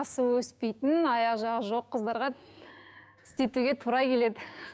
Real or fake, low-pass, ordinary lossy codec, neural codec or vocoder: fake; none; none; codec, 16 kHz, 6 kbps, DAC